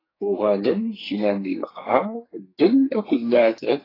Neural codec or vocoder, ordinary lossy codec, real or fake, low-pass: codec, 44.1 kHz, 2.6 kbps, SNAC; AAC, 24 kbps; fake; 5.4 kHz